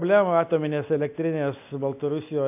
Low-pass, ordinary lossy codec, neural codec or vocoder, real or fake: 3.6 kHz; MP3, 32 kbps; none; real